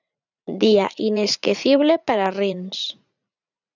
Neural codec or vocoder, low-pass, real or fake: vocoder, 44.1 kHz, 80 mel bands, Vocos; 7.2 kHz; fake